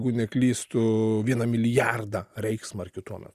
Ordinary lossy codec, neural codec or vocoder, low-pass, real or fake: Opus, 64 kbps; none; 14.4 kHz; real